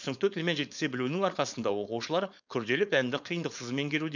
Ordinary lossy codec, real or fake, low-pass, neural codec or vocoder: none; fake; 7.2 kHz; codec, 16 kHz, 4.8 kbps, FACodec